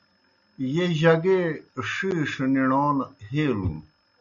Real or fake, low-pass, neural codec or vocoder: real; 7.2 kHz; none